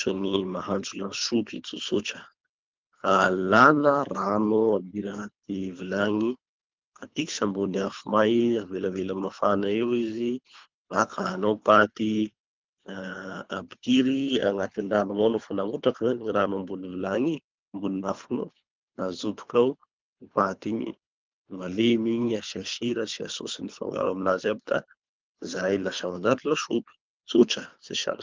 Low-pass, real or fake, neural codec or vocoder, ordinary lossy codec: 7.2 kHz; fake; codec, 24 kHz, 3 kbps, HILCodec; Opus, 32 kbps